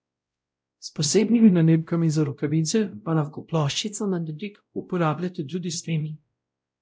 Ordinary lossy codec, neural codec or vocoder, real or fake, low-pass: none; codec, 16 kHz, 0.5 kbps, X-Codec, WavLM features, trained on Multilingual LibriSpeech; fake; none